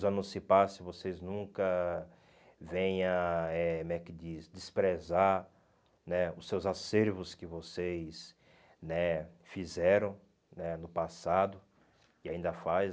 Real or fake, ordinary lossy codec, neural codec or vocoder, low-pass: real; none; none; none